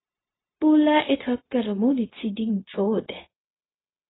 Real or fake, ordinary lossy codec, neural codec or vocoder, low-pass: fake; AAC, 16 kbps; codec, 16 kHz, 0.4 kbps, LongCat-Audio-Codec; 7.2 kHz